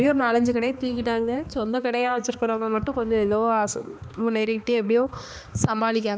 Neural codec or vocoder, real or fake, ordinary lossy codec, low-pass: codec, 16 kHz, 2 kbps, X-Codec, HuBERT features, trained on balanced general audio; fake; none; none